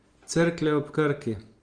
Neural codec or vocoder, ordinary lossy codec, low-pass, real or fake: none; Opus, 24 kbps; 9.9 kHz; real